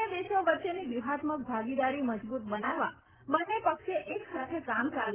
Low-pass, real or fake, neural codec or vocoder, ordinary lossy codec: 3.6 kHz; real; none; Opus, 16 kbps